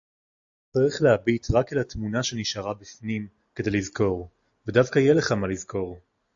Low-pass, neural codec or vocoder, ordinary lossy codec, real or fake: 7.2 kHz; none; AAC, 64 kbps; real